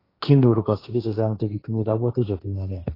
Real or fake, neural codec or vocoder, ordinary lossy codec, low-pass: fake; codec, 16 kHz, 1.1 kbps, Voila-Tokenizer; AAC, 24 kbps; 5.4 kHz